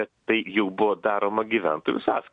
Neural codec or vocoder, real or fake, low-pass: none; real; 9.9 kHz